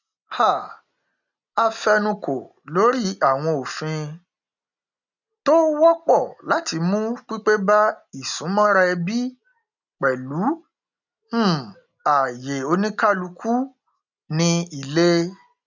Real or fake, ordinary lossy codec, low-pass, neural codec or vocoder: real; none; 7.2 kHz; none